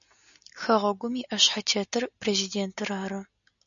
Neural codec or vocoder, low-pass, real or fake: none; 7.2 kHz; real